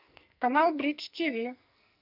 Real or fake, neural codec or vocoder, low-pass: fake; codec, 44.1 kHz, 2.6 kbps, SNAC; 5.4 kHz